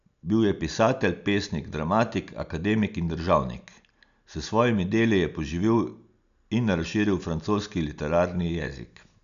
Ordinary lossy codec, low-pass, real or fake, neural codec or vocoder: none; 7.2 kHz; real; none